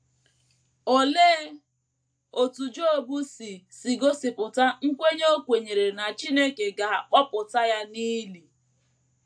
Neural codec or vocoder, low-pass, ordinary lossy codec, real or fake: none; 9.9 kHz; AAC, 64 kbps; real